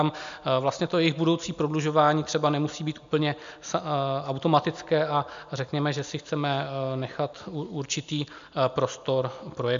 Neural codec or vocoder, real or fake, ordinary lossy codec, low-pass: none; real; MP3, 64 kbps; 7.2 kHz